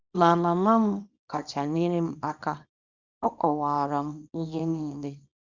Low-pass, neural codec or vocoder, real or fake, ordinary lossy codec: 7.2 kHz; codec, 24 kHz, 0.9 kbps, WavTokenizer, small release; fake; Opus, 64 kbps